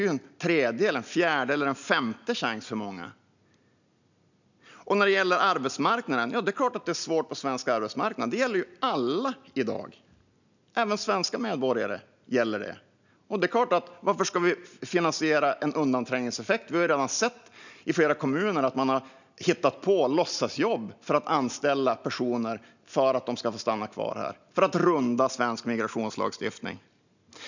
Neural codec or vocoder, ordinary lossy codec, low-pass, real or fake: none; none; 7.2 kHz; real